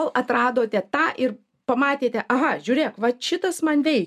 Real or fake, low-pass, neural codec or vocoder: real; 14.4 kHz; none